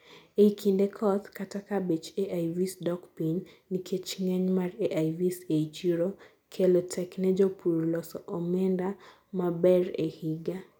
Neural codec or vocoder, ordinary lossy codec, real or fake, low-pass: none; none; real; 19.8 kHz